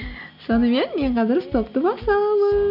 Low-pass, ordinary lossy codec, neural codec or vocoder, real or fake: 5.4 kHz; none; none; real